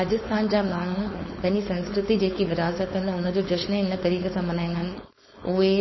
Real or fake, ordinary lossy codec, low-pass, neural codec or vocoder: fake; MP3, 24 kbps; 7.2 kHz; codec, 16 kHz, 4.8 kbps, FACodec